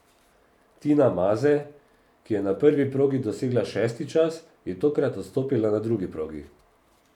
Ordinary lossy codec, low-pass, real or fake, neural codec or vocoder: none; 19.8 kHz; fake; vocoder, 44.1 kHz, 128 mel bands every 512 samples, BigVGAN v2